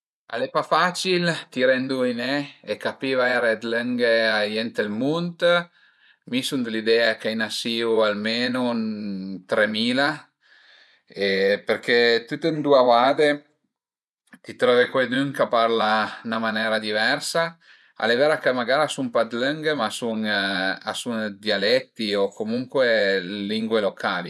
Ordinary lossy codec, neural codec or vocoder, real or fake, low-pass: none; vocoder, 24 kHz, 100 mel bands, Vocos; fake; none